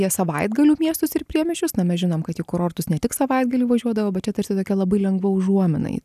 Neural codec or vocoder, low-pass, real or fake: none; 14.4 kHz; real